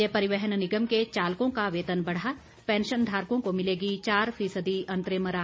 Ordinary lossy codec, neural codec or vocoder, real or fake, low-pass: none; none; real; none